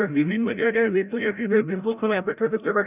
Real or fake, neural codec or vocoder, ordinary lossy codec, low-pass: fake; codec, 16 kHz, 0.5 kbps, FreqCodec, larger model; none; 3.6 kHz